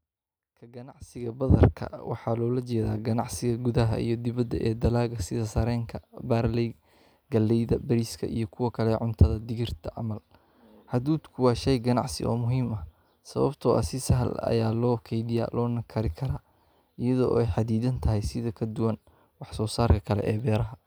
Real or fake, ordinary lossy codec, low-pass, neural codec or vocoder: real; none; none; none